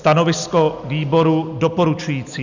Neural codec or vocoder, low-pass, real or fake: none; 7.2 kHz; real